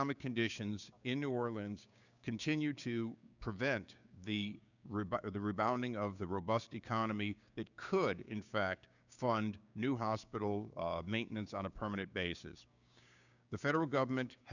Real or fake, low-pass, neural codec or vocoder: fake; 7.2 kHz; codec, 16 kHz, 6 kbps, DAC